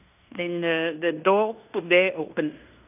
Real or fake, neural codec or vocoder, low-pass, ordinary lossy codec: fake; codec, 16 kHz in and 24 kHz out, 0.9 kbps, LongCat-Audio-Codec, fine tuned four codebook decoder; 3.6 kHz; none